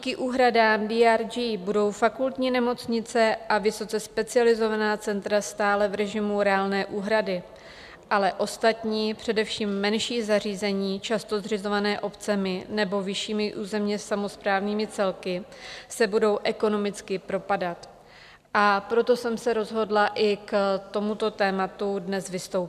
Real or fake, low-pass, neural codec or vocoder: real; 14.4 kHz; none